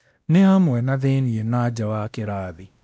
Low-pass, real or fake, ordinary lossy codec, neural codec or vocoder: none; fake; none; codec, 16 kHz, 1 kbps, X-Codec, WavLM features, trained on Multilingual LibriSpeech